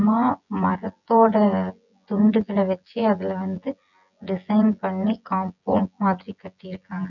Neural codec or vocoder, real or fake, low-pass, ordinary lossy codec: vocoder, 24 kHz, 100 mel bands, Vocos; fake; 7.2 kHz; none